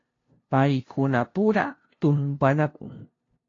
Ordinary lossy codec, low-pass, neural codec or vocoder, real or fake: AAC, 32 kbps; 7.2 kHz; codec, 16 kHz, 0.5 kbps, FunCodec, trained on LibriTTS, 25 frames a second; fake